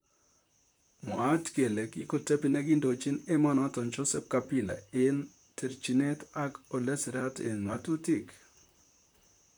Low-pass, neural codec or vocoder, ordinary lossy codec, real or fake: none; vocoder, 44.1 kHz, 128 mel bands, Pupu-Vocoder; none; fake